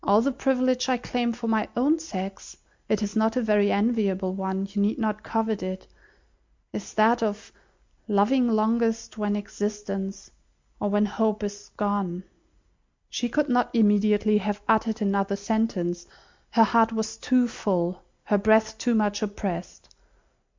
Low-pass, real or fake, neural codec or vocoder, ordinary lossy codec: 7.2 kHz; real; none; MP3, 64 kbps